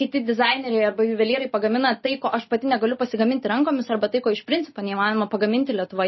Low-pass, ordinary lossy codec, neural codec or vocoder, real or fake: 7.2 kHz; MP3, 24 kbps; none; real